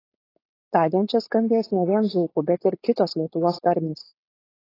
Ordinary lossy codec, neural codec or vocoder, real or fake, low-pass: AAC, 24 kbps; codec, 16 kHz, 4.8 kbps, FACodec; fake; 5.4 kHz